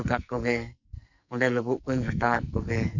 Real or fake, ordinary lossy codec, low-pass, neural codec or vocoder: fake; none; 7.2 kHz; codec, 44.1 kHz, 2.6 kbps, SNAC